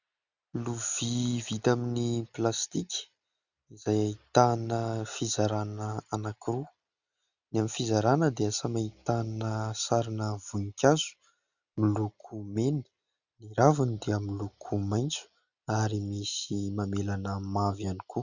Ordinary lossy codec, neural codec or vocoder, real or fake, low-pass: Opus, 64 kbps; none; real; 7.2 kHz